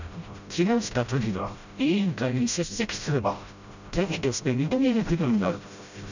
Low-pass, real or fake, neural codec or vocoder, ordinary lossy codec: 7.2 kHz; fake; codec, 16 kHz, 0.5 kbps, FreqCodec, smaller model; none